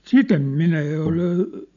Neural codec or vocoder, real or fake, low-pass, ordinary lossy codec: none; real; 7.2 kHz; none